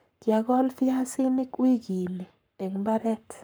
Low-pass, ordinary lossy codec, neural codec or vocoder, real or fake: none; none; codec, 44.1 kHz, 7.8 kbps, Pupu-Codec; fake